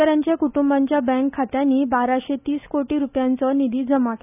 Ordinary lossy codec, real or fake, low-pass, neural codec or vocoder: none; real; 3.6 kHz; none